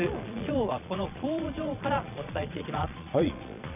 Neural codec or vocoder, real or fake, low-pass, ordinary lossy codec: vocoder, 22.05 kHz, 80 mel bands, Vocos; fake; 3.6 kHz; none